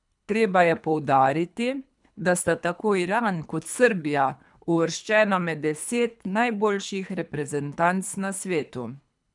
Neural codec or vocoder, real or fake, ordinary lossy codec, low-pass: codec, 24 kHz, 3 kbps, HILCodec; fake; none; 10.8 kHz